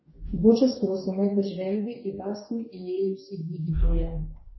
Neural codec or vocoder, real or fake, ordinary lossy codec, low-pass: codec, 44.1 kHz, 2.6 kbps, DAC; fake; MP3, 24 kbps; 7.2 kHz